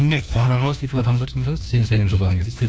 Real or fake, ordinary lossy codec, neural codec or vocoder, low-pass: fake; none; codec, 16 kHz, 1 kbps, FunCodec, trained on LibriTTS, 50 frames a second; none